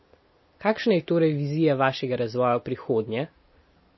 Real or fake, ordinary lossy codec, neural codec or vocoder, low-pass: real; MP3, 24 kbps; none; 7.2 kHz